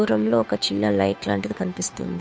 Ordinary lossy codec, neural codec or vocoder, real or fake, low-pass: none; codec, 16 kHz, 2 kbps, FunCodec, trained on Chinese and English, 25 frames a second; fake; none